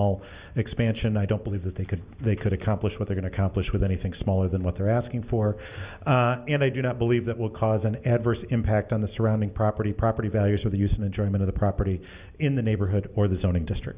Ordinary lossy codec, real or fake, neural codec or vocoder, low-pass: Opus, 64 kbps; real; none; 3.6 kHz